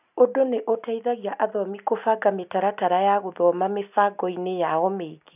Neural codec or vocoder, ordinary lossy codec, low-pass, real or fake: none; none; 3.6 kHz; real